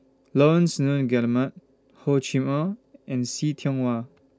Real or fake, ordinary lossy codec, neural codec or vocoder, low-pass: real; none; none; none